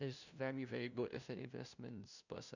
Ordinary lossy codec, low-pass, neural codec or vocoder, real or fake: none; 7.2 kHz; codec, 16 kHz, 0.5 kbps, FunCodec, trained on LibriTTS, 25 frames a second; fake